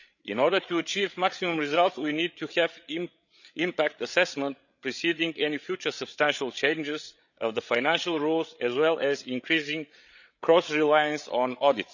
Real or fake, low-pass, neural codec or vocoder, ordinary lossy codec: fake; 7.2 kHz; codec, 16 kHz, 8 kbps, FreqCodec, larger model; none